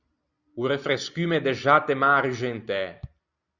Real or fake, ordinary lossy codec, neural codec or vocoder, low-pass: real; Opus, 64 kbps; none; 7.2 kHz